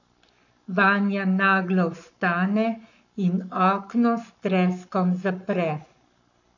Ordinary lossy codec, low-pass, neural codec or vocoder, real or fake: none; 7.2 kHz; codec, 44.1 kHz, 7.8 kbps, Pupu-Codec; fake